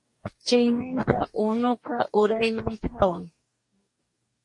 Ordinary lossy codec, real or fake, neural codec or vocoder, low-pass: MP3, 48 kbps; fake; codec, 44.1 kHz, 2.6 kbps, DAC; 10.8 kHz